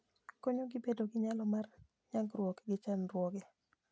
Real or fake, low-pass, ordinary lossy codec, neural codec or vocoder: real; none; none; none